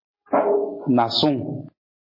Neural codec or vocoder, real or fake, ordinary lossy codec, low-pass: none; real; MP3, 24 kbps; 5.4 kHz